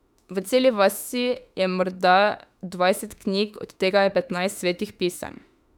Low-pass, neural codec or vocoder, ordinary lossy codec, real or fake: 19.8 kHz; autoencoder, 48 kHz, 32 numbers a frame, DAC-VAE, trained on Japanese speech; none; fake